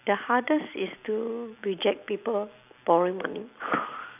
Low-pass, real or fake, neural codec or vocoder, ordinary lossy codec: 3.6 kHz; fake; vocoder, 44.1 kHz, 128 mel bands every 256 samples, BigVGAN v2; none